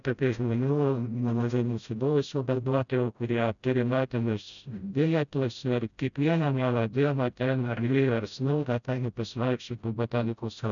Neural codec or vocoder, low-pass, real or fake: codec, 16 kHz, 0.5 kbps, FreqCodec, smaller model; 7.2 kHz; fake